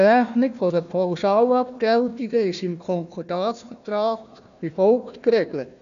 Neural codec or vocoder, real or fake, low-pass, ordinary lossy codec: codec, 16 kHz, 1 kbps, FunCodec, trained on Chinese and English, 50 frames a second; fake; 7.2 kHz; none